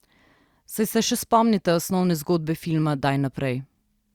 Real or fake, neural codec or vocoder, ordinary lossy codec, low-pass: real; none; Opus, 64 kbps; 19.8 kHz